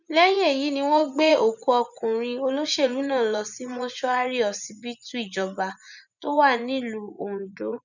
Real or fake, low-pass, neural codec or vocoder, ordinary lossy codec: fake; 7.2 kHz; vocoder, 24 kHz, 100 mel bands, Vocos; none